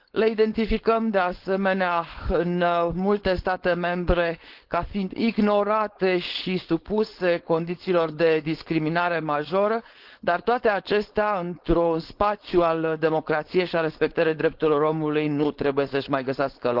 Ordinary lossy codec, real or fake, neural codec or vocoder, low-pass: Opus, 16 kbps; fake; codec, 16 kHz, 4.8 kbps, FACodec; 5.4 kHz